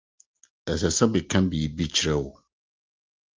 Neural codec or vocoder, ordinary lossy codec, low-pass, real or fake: none; Opus, 32 kbps; 7.2 kHz; real